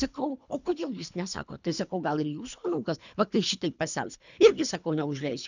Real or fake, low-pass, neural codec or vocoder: fake; 7.2 kHz; codec, 24 kHz, 3 kbps, HILCodec